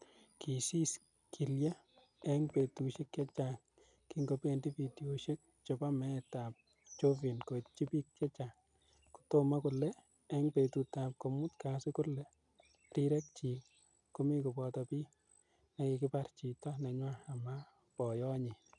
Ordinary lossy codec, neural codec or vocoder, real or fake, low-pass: none; none; real; 10.8 kHz